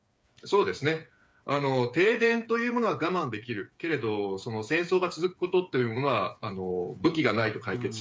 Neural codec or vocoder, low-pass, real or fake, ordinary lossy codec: codec, 16 kHz, 8 kbps, FreqCodec, smaller model; none; fake; none